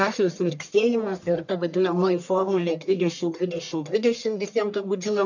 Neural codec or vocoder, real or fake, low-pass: codec, 44.1 kHz, 1.7 kbps, Pupu-Codec; fake; 7.2 kHz